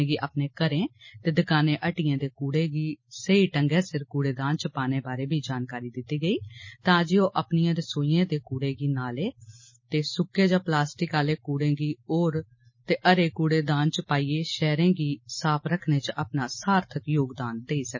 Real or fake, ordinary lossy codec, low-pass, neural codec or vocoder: real; MP3, 32 kbps; 7.2 kHz; none